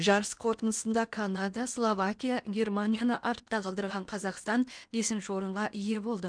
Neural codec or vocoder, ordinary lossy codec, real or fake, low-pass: codec, 16 kHz in and 24 kHz out, 0.8 kbps, FocalCodec, streaming, 65536 codes; none; fake; 9.9 kHz